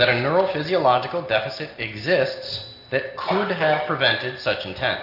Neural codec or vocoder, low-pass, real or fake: none; 5.4 kHz; real